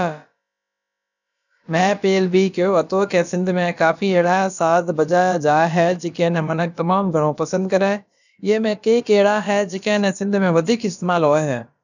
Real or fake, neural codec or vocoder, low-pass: fake; codec, 16 kHz, about 1 kbps, DyCAST, with the encoder's durations; 7.2 kHz